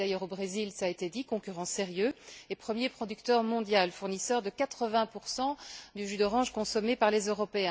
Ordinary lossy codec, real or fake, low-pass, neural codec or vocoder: none; real; none; none